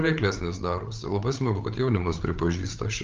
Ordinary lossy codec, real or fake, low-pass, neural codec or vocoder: Opus, 32 kbps; fake; 7.2 kHz; codec, 16 kHz, 8 kbps, FreqCodec, larger model